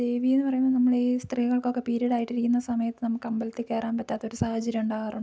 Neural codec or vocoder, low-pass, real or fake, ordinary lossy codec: none; none; real; none